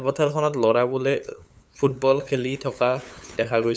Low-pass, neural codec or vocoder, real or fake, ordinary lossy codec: none; codec, 16 kHz, 8 kbps, FunCodec, trained on LibriTTS, 25 frames a second; fake; none